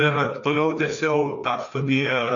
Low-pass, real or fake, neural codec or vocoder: 7.2 kHz; fake; codec, 16 kHz, 2 kbps, FreqCodec, larger model